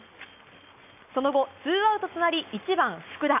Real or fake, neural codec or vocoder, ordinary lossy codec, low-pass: real; none; none; 3.6 kHz